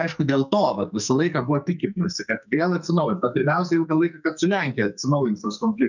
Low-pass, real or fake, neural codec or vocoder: 7.2 kHz; fake; codec, 44.1 kHz, 2.6 kbps, SNAC